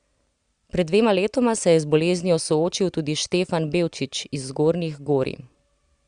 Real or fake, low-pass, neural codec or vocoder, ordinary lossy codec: real; 9.9 kHz; none; Opus, 64 kbps